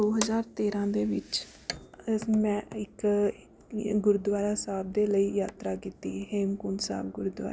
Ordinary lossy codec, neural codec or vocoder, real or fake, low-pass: none; none; real; none